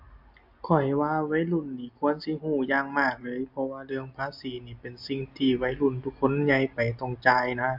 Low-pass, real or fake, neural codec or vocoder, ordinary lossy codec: 5.4 kHz; real; none; AAC, 48 kbps